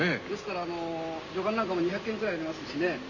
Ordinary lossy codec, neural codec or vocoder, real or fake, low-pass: none; none; real; 7.2 kHz